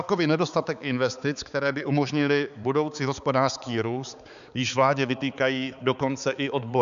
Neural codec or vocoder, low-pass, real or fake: codec, 16 kHz, 4 kbps, X-Codec, HuBERT features, trained on balanced general audio; 7.2 kHz; fake